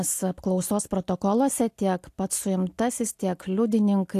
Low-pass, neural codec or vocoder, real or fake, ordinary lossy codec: 14.4 kHz; none; real; AAC, 64 kbps